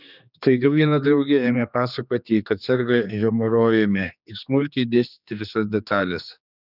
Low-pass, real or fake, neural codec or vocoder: 5.4 kHz; fake; codec, 16 kHz, 2 kbps, X-Codec, HuBERT features, trained on general audio